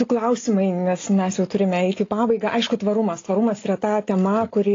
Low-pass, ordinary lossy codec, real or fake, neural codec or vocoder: 7.2 kHz; AAC, 32 kbps; real; none